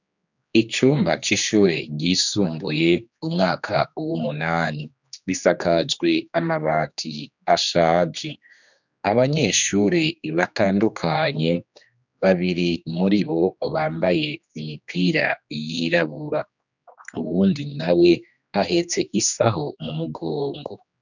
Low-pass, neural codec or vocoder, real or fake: 7.2 kHz; codec, 16 kHz, 2 kbps, X-Codec, HuBERT features, trained on general audio; fake